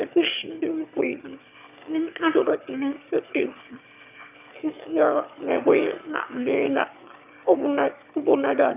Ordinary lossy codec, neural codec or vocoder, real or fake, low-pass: none; autoencoder, 22.05 kHz, a latent of 192 numbers a frame, VITS, trained on one speaker; fake; 3.6 kHz